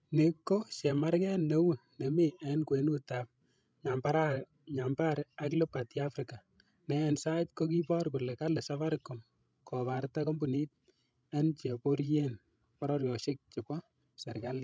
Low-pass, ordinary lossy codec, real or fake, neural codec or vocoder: none; none; fake; codec, 16 kHz, 16 kbps, FreqCodec, larger model